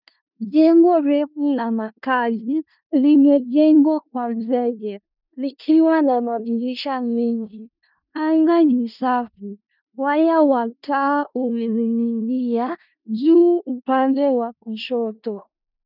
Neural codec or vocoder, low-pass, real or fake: codec, 16 kHz in and 24 kHz out, 0.4 kbps, LongCat-Audio-Codec, four codebook decoder; 5.4 kHz; fake